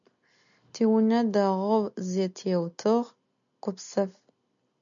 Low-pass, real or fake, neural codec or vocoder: 7.2 kHz; real; none